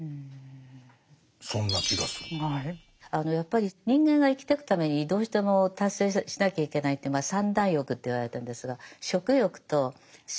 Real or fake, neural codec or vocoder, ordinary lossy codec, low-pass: real; none; none; none